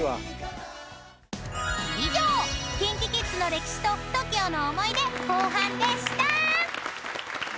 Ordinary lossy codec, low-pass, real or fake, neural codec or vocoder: none; none; real; none